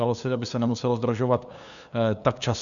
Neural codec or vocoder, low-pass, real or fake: codec, 16 kHz, 2 kbps, FunCodec, trained on LibriTTS, 25 frames a second; 7.2 kHz; fake